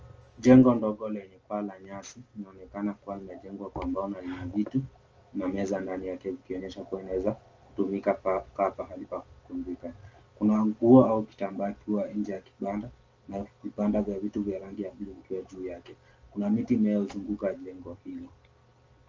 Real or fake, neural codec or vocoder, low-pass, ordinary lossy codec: real; none; 7.2 kHz; Opus, 24 kbps